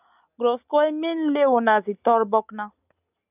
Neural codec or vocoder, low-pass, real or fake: none; 3.6 kHz; real